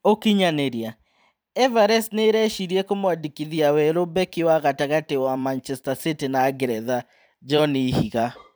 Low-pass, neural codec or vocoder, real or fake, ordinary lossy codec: none; vocoder, 44.1 kHz, 128 mel bands every 512 samples, BigVGAN v2; fake; none